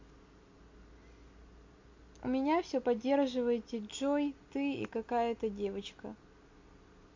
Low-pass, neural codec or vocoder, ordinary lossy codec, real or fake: 7.2 kHz; none; MP3, 48 kbps; real